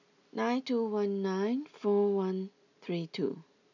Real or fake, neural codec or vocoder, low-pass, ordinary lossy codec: real; none; 7.2 kHz; none